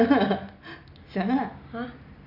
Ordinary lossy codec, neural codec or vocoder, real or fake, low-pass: none; none; real; 5.4 kHz